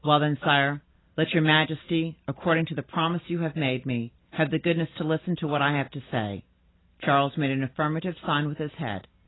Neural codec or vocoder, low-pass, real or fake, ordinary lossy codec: none; 7.2 kHz; real; AAC, 16 kbps